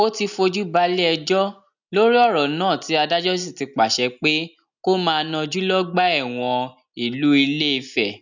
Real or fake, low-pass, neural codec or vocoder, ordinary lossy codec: real; 7.2 kHz; none; none